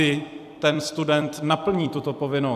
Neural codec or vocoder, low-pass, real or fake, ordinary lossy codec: vocoder, 44.1 kHz, 128 mel bands every 256 samples, BigVGAN v2; 14.4 kHz; fake; AAC, 96 kbps